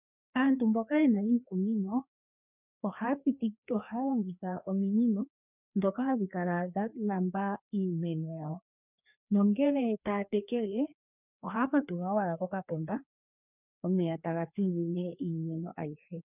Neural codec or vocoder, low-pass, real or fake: codec, 16 kHz, 2 kbps, FreqCodec, larger model; 3.6 kHz; fake